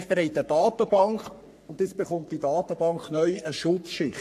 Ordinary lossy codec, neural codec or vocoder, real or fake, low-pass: AAC, 64 kbps; codec, 44.1 kHz, 3.4 kbps, Pupu-Codec; fake; 14.4 kHz